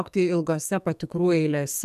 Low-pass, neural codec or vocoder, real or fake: 14.4 kHz; codec, 44.1 kHz, 2.6 kbps, SNAC; fake